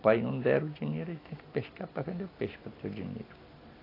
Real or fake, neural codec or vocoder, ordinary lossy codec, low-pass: real; none; AAC, 24 kbps; 5.4 kHz